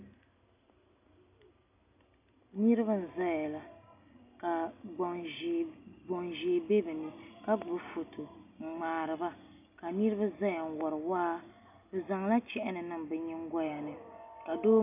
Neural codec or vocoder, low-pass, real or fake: none; 3.6 kHz; real